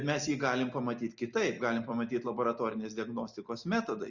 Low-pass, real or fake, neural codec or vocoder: 7.2 kHz; real; none